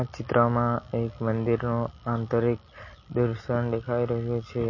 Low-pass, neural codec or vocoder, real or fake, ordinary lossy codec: 7.2 kHz; none; real; MP3, 32 kbps